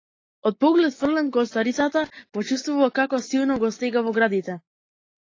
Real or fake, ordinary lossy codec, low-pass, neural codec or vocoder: real; AAC, 32 kbps; 7.2 kHz; none